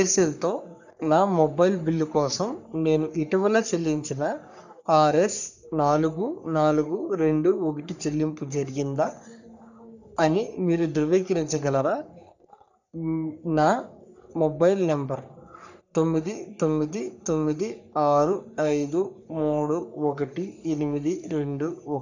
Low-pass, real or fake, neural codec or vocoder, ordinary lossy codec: 7.2 kHz; fake; codec, 44.1 kHz, 3.4 kbps, Pupu-Codec; none